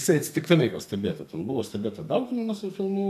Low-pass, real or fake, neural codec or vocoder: 14.4 kHz; fake; codec, 32 kHz, 1.9 kbps, SNAC